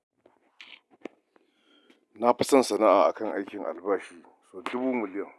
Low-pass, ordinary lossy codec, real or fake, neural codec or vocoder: none; none; real; none